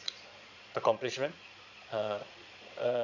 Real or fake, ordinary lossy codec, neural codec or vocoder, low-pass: fake; none; vocoder, 22.05 kHz, 80 mel bands, Vocos; 7.2 kHz